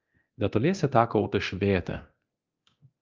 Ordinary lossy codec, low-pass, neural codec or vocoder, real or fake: Opus, 24 kbps; 7.2 kHz; codec, 24 kHz, 0.9 kbps, DualCodec; fake